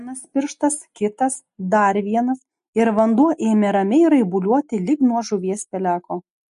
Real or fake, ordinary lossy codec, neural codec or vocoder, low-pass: real; MP3, 48 kbps; none; 14.4 kHz